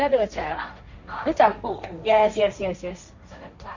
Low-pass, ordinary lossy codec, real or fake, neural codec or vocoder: 7.2 kHz; none; fake; codec, 16 kHz, 1.1 kbps, Voila-Tokenizer